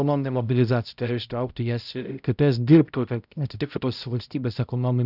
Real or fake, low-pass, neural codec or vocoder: fake; 5.4 kHz; codec, 16 kHz, 0.5 kbps, X-Codec, HuBERT features, trained on balanced general audio